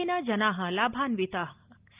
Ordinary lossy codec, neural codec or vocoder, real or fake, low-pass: Opus, 32 kbps; none; real; 3.6 kHz